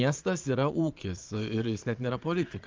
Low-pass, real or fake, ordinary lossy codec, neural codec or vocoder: 7.2 kHz; fake; Opus, 24 kbps; vocoder, 22.05 kHz, 80 mel bands, Vocos